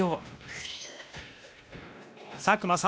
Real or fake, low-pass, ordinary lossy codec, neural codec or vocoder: fake; none; none; codec, 16 kHz, 1 kbps, X-Codec, WavLM features, trained on Multilingual LibriSpeech